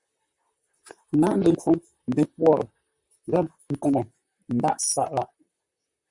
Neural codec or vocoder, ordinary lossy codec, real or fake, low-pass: vocoder, 44.1 kHz, 128 mel bands, Pupu-Vocoder; Opus, 64 kbps; fake; 10.8 kHz